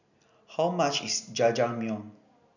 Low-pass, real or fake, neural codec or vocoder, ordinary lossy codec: 7.2 kHz; real; none; none